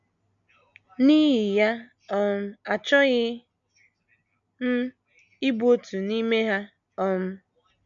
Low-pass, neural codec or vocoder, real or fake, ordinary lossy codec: 7.2 kHz; none; real; none